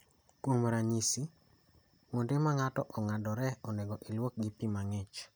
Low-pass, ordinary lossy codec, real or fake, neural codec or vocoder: none; none; real; none